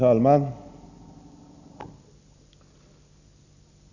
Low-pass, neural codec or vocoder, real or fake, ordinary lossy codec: 7.2 kHz; none; real; none